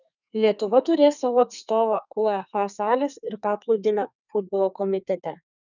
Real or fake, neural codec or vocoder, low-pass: fake; codec, 32 kHz, 1.9 kbps, SNAC; 7.2 kHz